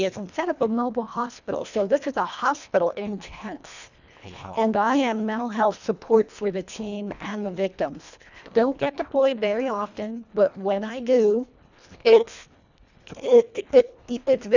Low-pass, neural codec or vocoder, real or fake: 7.2 kHz; codec, 24 kHz, 1.5 kbps, HILCodec; fake